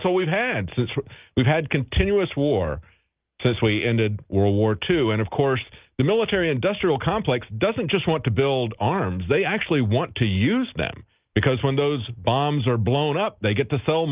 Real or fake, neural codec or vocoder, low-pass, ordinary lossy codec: real; none; 3.6 kHz; Opus, 32 kbps